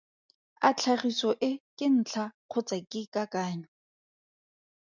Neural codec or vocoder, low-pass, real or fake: none; 7.2 kHz; real